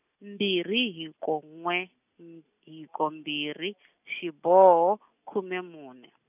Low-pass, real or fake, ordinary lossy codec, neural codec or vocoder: 3.6 kHz; real; none; none